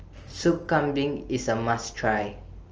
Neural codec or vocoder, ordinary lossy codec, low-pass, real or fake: none; Opus, 24 kbps; 7.2 kHz; real